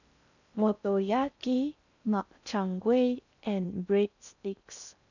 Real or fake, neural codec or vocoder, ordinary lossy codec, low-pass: fake; codec, 16 kHz in and 24 kHz out, 0.6 kbps, FocalCodec, streaming, 4096 codes; none; 7.2 kHz